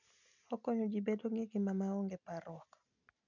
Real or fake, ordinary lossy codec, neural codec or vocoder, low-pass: real; none; none; 7.2 kHz